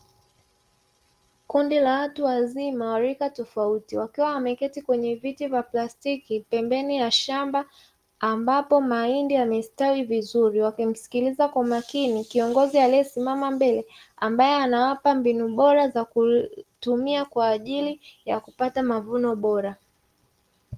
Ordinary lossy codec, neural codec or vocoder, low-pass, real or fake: Opus, 24 kbps; none; 14.4 kHz; real